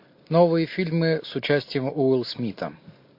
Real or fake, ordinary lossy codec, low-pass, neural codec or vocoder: real; MP3, 32 kbps; 5.4 kHz; none